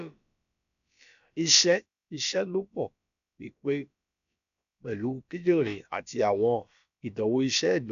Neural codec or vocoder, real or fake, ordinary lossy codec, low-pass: codec, 16 kHz, about 1 kbps, DyCAST, with the encoder's durations; fake; none; 7.2 kHz